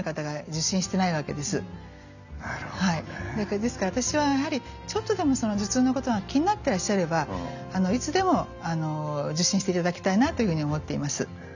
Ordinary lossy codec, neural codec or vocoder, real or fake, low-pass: none; none; real; 7.2 kHz